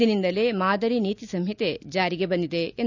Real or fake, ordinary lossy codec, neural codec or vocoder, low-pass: real; none; none; 7.2 kHz